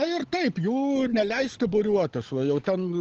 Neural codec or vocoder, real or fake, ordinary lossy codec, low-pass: codec, 16 kHz, 16 kbps, FunCodec, trained on LibriTTS, 50 frames a second; fake; Opus, 16 kbps; 7.2 kHz